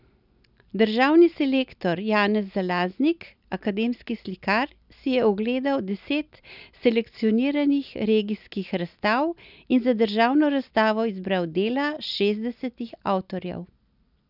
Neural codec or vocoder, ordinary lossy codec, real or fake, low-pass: none; none; real; 5.4 kHz